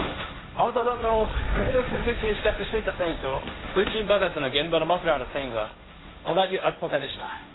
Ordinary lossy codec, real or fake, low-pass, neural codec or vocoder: AAC, 16 kbps; fake; 7.2 kHz; codec, 16 kHz, 1.1 kbps, Voila-Tokenizer